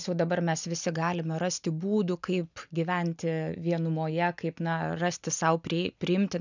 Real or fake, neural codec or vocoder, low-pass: real; none; 7.2 kHz